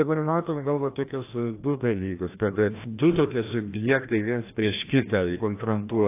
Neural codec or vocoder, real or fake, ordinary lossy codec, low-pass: codec, 16 kHz, 1 kbps, FreqCodec, larger model; fake; AAC, 24 kbps; 3.6 kHz